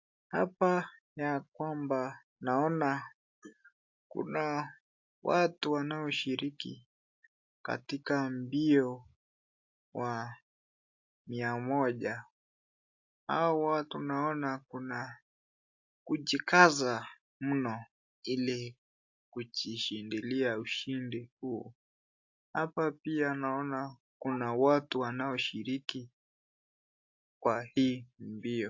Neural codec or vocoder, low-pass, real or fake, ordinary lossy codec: none; 7.2 kHz; real; AAC, 48 kbps